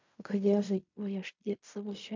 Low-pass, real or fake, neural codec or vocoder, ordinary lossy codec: 7.2 kHz; fake; codec, 16 kHz in and 24 kHz out, 0.4 kbps, LongCat-Audio-Codec, fine tuned four codebook decoder; MP3, 64 kbps